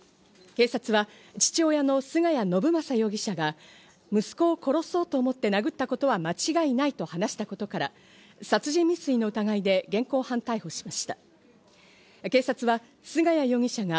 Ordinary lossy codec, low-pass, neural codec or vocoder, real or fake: none; none; none; real